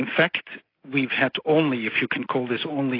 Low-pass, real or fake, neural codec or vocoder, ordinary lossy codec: 5.4 kHz; real; none; AAC, 24 kbps